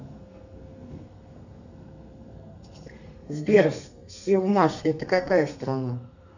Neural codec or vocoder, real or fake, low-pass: codec, 32 kHz, 1.9 kbps, SNAC; fake; 7.2 kHz